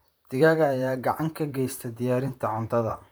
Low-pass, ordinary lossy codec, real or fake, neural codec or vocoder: none; none; fake; vocoder, 44.1 kHz, 128 mel bands, Pupu-Vocoder